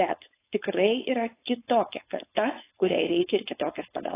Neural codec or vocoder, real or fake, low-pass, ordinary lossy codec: codec, 16 kHz, 4.8 kbps, FACodec; fake; 3.6 kHz; AAC, 16 kbps